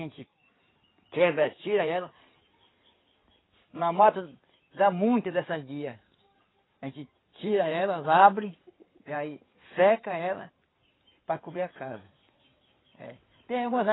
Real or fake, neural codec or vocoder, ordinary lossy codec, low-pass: fake; codec, 16 kHz in and 24 kHz out, 2.2 kbps, FireRedTTS-2 codec; AAC, 16 kbps; 7.2 kHz